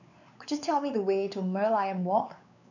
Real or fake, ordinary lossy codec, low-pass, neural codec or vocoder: fake; none; 7.2 kHz; codec, 16 kHz, 4 kbps, X-Codec, WavLM features, trained on Multilingual LibriSpeech